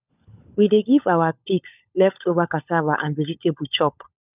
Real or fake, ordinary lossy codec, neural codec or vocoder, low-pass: fake; none; codec, 16 kHz, 16 kbps, FunCodec, trained on LibriTTS, 50 frames a second; 3.6 kHz